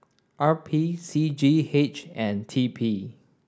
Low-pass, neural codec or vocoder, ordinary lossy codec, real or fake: none; none; none; real